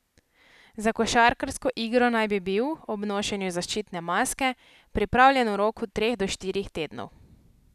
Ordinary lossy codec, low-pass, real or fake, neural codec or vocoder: none; 14.4 kHz; real; none